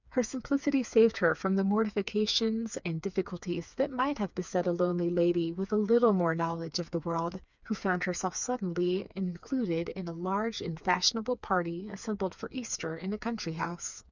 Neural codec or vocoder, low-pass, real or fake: codec, 16 kHz, 4 kbps, FreqCodec, smaller model; 7.2 kHz; fake